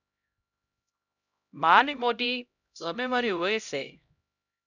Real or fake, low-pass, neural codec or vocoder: fake; 7.2 kHz; codec, 16 kHz, 0.5 kbps, X-Codec, HuBERT features, trained on LibriSpeech